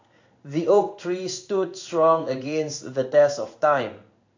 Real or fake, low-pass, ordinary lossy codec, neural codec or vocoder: fake; 7.2 kHz; AAC, 48 kbps; codec, 16 kHz in and 24 kHz out, 1 kbps, XY-Tokenizer